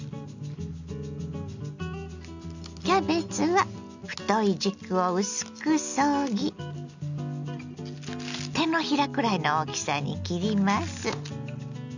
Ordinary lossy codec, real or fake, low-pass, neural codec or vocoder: none; real; 7.2 kHz; none